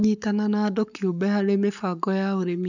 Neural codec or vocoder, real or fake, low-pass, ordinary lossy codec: codec, 44.1 kHz, 7.8 kbps, Pupu-Codec; fake; 7.2 kHz; none